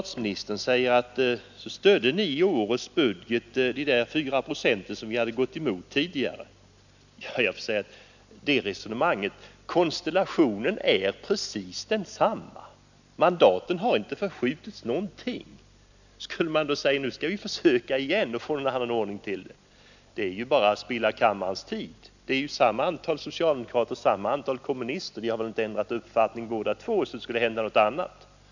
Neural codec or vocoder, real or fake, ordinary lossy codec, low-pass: none; real; none; 7.2 kHz